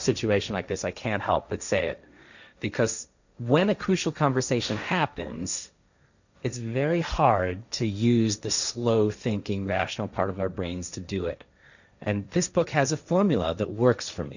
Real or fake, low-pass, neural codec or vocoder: fake; 7.2 kHz; codec, 16 kHz, 1.1 kbps, Voila-Tokenizer